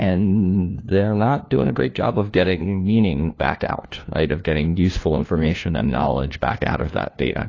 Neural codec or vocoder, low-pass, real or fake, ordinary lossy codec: codec, 16 kHz, 1 kbps, FunCodec, trained on LibriTTS, 50 frames a second; 7.2 kHz; fake; AAC, 32 kbps